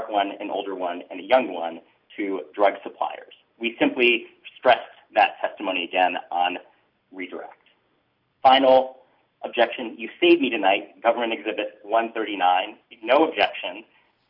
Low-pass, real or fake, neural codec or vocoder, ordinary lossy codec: 5.4 kHz; real; none; MP3, 48 kbps